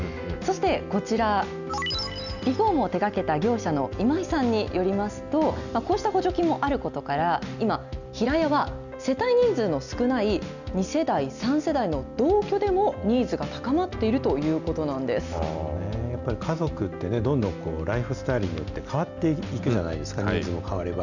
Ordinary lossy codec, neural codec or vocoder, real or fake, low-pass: none; none; real; 7.2 kHz